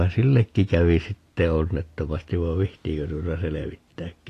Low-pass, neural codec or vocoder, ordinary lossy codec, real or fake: 14.4 kHz; none; AAC, 48 kbps; real